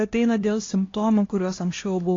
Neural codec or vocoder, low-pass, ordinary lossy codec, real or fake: codec, 16 kHz, 1 kbps, X-Codec, HuBERT features, trained on LibriSpeech; 7.2 kHz; AAC, 32 kbps; fake